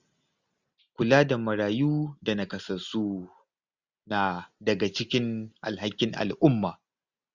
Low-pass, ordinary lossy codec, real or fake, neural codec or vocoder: 7.2 kHz; Opus, 64 kbps; real; none